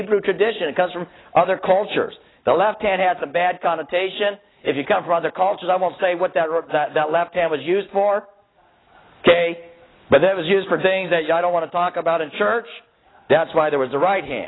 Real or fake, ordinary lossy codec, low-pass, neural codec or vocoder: real; AAC, 16 kbps; 7.2 kHz; none